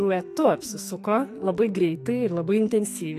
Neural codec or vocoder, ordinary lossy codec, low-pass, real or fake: codec, 44.1 kHz, 2.6 kbps, SNAC; AAC, 64 kbps; 14.4 kHz; fake